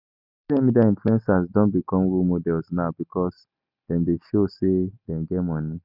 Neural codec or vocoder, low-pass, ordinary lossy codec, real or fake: none; 5.4 kHz; none; real